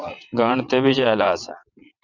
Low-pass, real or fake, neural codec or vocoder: 7.2 kHz; fake; vocoder, 22.05 kHz, 80 mel bands, WaveNeXt